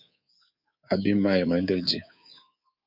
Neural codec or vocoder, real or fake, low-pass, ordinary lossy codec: codec, 24 kHz, 3.1 kbps, DualCodec; fake; 5.4 kHz; Opus, 64 kbps